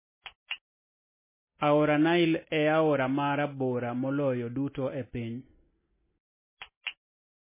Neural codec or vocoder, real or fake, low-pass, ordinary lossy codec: none; real; 3.6 kHz; MP3, 16 kbps